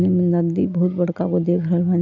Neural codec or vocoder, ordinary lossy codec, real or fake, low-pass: none; none; real; 7.2 kHz